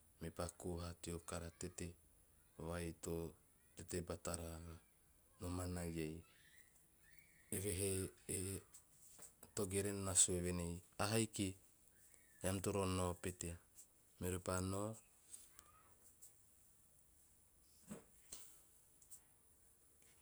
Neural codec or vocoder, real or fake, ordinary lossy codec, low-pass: none; real; none; none